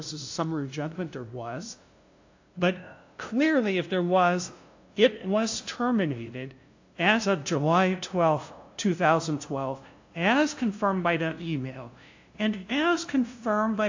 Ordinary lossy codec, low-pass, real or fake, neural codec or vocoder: AAC, 48 kbps; 7.2 kHz; fake; codec, 16 kHz, 0.5 kbps, FunCodec, trained on LibriTTS, 25 frames a second